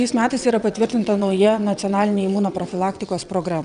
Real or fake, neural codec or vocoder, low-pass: fake; vocoder, 22.05 kHz, 80 mel bands, WaveNeXt; 9.9 kHz